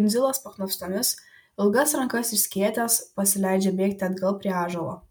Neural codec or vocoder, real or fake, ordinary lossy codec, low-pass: none; real; MP3, 96 kbps; 19.8 kHz